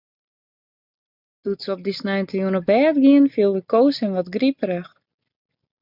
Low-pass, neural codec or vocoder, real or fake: 5.4 kHz; none; real